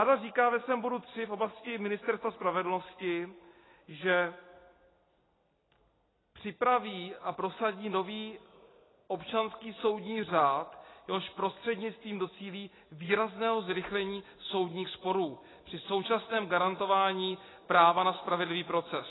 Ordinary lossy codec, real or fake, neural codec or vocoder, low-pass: AAC, 16 kbps; real; none; 7.2 kHz